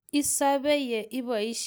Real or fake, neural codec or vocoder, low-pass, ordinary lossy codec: real; none; none; none